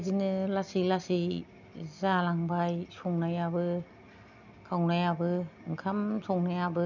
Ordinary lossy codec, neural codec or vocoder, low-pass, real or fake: none; none; 7.2 kHz; real